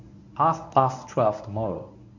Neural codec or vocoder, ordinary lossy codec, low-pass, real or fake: codec, 24 kHz, 0.9 kbps, WavTokenizer, medium speech release version 1; none; 7.2 kHz; fake